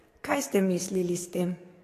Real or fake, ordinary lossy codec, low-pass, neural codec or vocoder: fake; AAC, 48 kbps; 14.4 kHz; codec, 44.1 kHz, 7.8 kbps, DAC